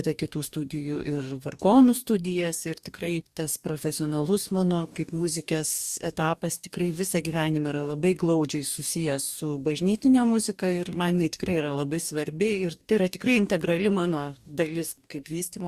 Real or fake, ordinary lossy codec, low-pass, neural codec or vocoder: fake; Opus, 64 kbps; 14.4 kHz; codec, 44.1 kHz, 2.6 kbps, DAC